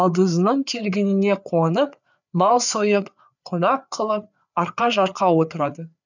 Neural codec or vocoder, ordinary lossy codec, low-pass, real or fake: codec, 16 kHz, 4 kbps, FreqCodec, larger model; none; 7.2 kHz; fake